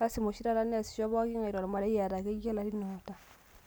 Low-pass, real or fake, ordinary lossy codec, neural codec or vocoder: none; real; none; none